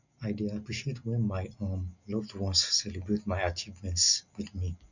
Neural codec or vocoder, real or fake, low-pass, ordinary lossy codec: none; real; 7.2 kHz; none